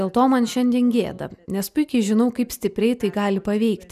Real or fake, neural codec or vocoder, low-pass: real; none; 14.4 kHz